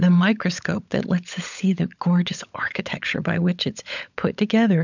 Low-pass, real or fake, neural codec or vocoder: 7.2 kHz; fake; codec, 16 kHz, 16 kbps, FunCodec, trained on LibriTTS, 50 frames a second